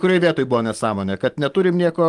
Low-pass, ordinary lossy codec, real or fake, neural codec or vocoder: 10.8 kHz; Opus, 24 kbps; real; none